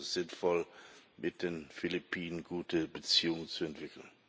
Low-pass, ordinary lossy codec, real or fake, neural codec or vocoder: none; none; real; none